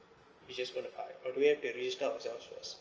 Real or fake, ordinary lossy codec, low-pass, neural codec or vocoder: real; Opus, 24 kbps; 7.2 kHz; none